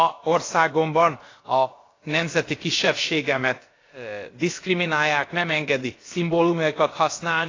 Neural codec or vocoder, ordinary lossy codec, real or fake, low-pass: codec, 16 kHz, about 1 kbps, DyCAST, with the encoder's durations; AAC, 32 kbps; fake; 7.2 kHz